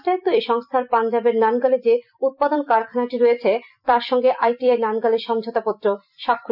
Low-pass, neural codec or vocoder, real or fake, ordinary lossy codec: 5.4 kHz; vocoder, 44.1 kHz, 128 mel bands every 256 samples, BigVGAN v2; fake; none